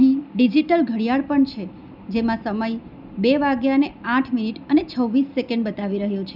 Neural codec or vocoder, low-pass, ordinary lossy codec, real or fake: none; 5.4 kHz; none; real